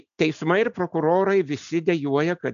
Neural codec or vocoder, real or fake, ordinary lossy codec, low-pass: none; real; MP3, 96 kbps; 7.2 kHz